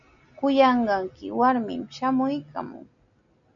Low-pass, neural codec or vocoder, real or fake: 7.2 kHz; none; real